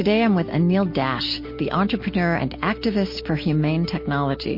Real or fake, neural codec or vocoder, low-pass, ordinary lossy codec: real; none; 5.4 kHz; MP3, 32 kbps